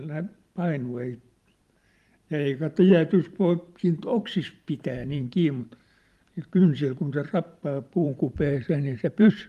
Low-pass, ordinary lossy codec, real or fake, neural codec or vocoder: 14.4 kHz; Opus, 32 kbps; fake; vocoder, 44.1 kHz, 128 mel bands every 512 samples, BigVGAN v2